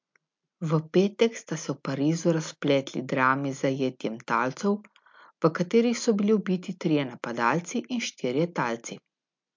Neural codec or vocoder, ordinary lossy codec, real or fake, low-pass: none; MP3, 64 kbps; real; 7.2 kHz